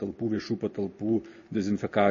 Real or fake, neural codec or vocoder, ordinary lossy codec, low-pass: real; none; MP3, 32 kbps; 7.2 kHz